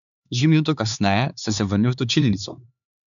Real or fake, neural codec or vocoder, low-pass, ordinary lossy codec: fake; codec, 16 kHz, 4 kbps, X-Codec, HuBERT features, trained on LibriSpeech; 7.2 kHz; none